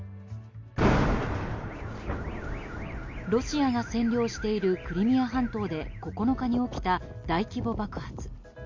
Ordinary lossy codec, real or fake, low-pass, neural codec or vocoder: MP3, 48 kbps; real; 7.2 kHz; none